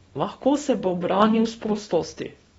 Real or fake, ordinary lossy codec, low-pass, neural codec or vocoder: fake; AAC, 24 kbps; 10.8 kHz; codec, 24 kHz, 0.9 kbps, WavTokenizer, small release